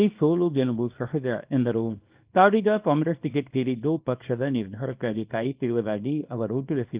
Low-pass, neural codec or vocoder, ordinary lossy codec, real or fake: 3.6 kHz; codec, 24 kHz, 0.9 kbps, WavTokenizer, small release; Opus, 32 kbps; fake